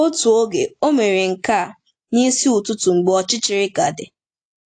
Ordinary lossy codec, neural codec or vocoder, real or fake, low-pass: AAC, 48 kbps; none; real; 9.9 kHz